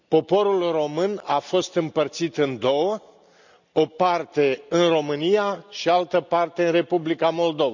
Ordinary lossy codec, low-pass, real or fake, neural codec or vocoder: none; 7.2 kHz; real; none